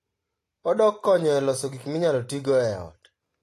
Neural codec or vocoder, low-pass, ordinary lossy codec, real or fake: none; 14.4 kHz; AAC, 48 kbps; real